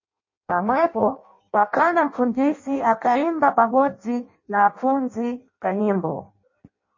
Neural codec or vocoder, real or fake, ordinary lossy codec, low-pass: codec, 16 kHz in and 24 kHz out, 0.6 kbps, FireRedTTS-2 codec; fake; MP3, 32 kbps; 7.2 kHz